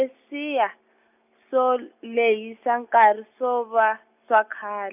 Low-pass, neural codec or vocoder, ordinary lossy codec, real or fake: 3.6 kHz; none; none; real